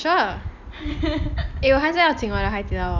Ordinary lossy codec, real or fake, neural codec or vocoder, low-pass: none; real; none; 7.2 kHz